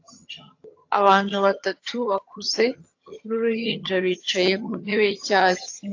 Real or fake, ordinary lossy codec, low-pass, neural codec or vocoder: fake; AAC, 48 kbps; 7.2 kHz; vocoder, 22.05 kHz, 80 mel bands, HiFi-GAN